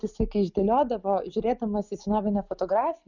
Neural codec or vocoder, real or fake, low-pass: none; real; 7.2 kHz